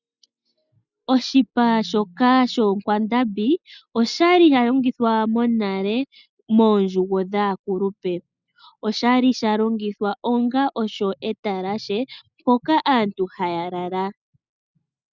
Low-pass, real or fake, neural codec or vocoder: 7.2 kHz; real; none